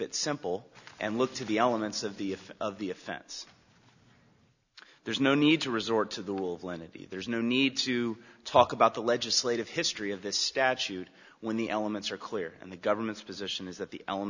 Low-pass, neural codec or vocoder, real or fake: 7.2 kHz; none; real